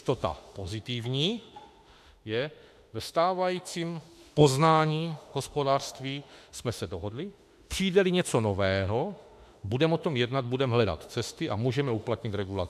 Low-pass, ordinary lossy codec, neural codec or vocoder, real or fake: 14.4 kHz; AAC, 96 kbps; autoencoder, 48 kHz, 32 numbers a frame, DAC-VAE, trained on Japanese speech; fake